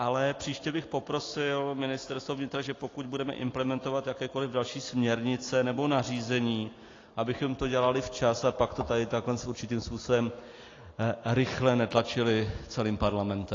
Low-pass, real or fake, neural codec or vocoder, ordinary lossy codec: 7.2 kHz; real; none; AAC, 32 kbps